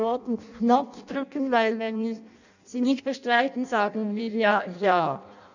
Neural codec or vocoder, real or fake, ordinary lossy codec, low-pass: codec, 16 kHz in and 24 kHz out, 0.6 kbps, FireRedTTS-2 codec; fake; none; 7.2 kHz